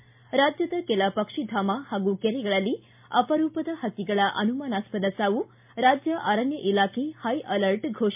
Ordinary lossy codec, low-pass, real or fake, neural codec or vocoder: MP3, 32 kbps; 3.6 kHz; real; none